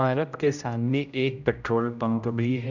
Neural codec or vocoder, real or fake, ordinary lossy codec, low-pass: codec, 16 kHz, 0.5 kbps, X-Codec, HuBERT features, trained on general audio; fake; none; 7.2 kHz